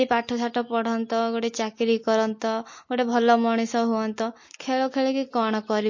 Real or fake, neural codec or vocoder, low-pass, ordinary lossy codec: real; none; 7.2 kHz; MP3, 32 kbps